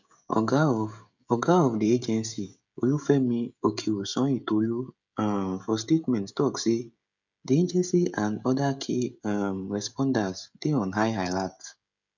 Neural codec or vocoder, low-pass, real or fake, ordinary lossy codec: codec, 16 kHz, 16 kbps, FreqCodec, smaller model; 7.2 kHz; fake; none